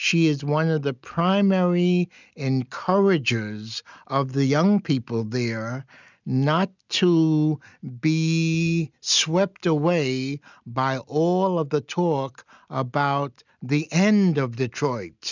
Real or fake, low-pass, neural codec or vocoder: real; 7.2 kHz; none